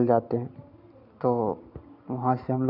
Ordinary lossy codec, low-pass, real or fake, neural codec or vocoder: none; 5.4 kHz; real; none